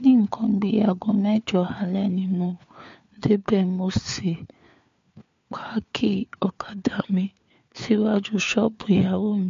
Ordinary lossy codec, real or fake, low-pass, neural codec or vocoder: MP3, 48 kbps; fake; 7.2 kHz; codec, 16 kHz, 4 kbps, FunCodec, trained on LibriTTS, 50 frames a second